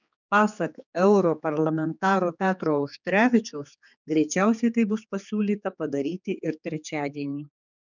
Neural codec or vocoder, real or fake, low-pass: codec, 16 kHz, 4 kbps, X-Codec, HuBERT features, trained on general audio; fake; 7.2 kHz